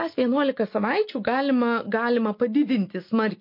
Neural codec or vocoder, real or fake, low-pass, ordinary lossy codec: none; real; 5.4 kHz; MP3, 32 kbps